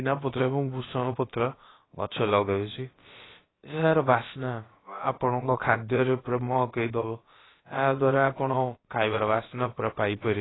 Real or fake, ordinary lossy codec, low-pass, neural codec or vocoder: fake; AAC, 16 kbps; 7.2 kHz; codec, 16 kHz, about 1 kbps, DyCAST, with the encoder's durations